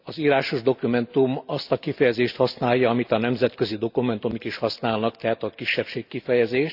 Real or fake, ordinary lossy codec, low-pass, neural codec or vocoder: real; none; 5.4 kHz; none